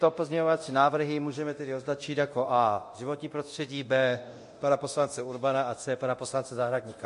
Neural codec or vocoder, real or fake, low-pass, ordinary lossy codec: codec, 24 kHz, 0.9 kbps, DualCodec; fake; 10.8 kHz; MP3, 48 kbps